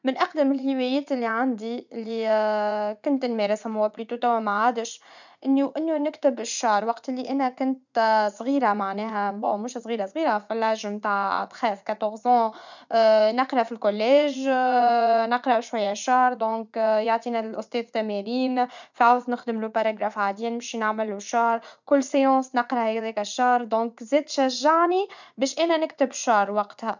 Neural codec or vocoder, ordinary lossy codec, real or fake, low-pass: vocoder, 44.1 kHz, 80 mel bands, Vocos; none; fake; 7.2 kHz